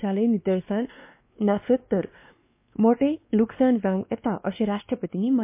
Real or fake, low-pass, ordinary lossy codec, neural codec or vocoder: fake; 3.6 kHz; MP3, 24 kbps; codec, 16 kHz, 2 kbps, X-Codec, WavLM features, trained on Multilingual LibriSpeech